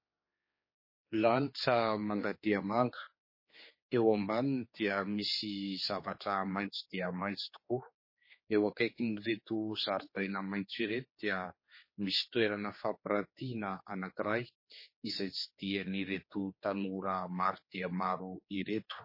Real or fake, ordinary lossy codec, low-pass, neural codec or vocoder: fake; MP3, 24 kbps; 5.4 kHz; codec, 16 kHz, 4 kbps, X-Codec, HuBERT features, trained on general audio